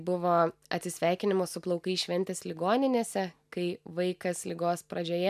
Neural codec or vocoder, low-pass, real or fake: none; 14.4 kHz; real